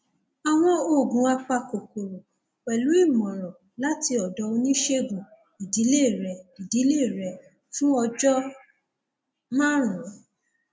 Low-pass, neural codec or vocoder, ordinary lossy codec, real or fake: none; none; none; real